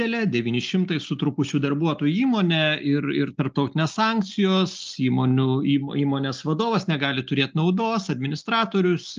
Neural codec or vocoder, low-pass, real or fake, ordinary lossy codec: none; 7.2 kHz; real; Opus, 24 kbps